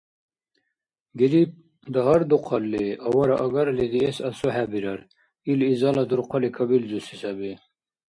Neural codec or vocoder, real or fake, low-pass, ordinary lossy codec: none; real; 9.9 kHz; MP3, 48 kbps